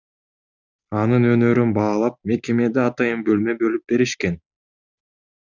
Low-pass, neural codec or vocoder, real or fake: 7.2 kHz; none; real